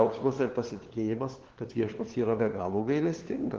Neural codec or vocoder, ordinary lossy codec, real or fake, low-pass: codec, 16 kHz, 2 kbps, FunCodec, trained on LibriTTS, 25 frames a second; Opus, 16 kbps; fake; 7.2 kHz